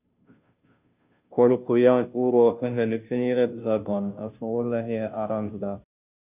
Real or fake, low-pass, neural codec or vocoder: fake; 3.6 kHz; codec, 16 kHz, 0.5 kbps, FunCodec, trained on Chinese and English, 25 frames a second